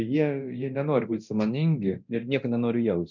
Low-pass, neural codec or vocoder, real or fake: 7.2 kHz; codec, 24 kHz, 0.9 kbps, DualCodec; fake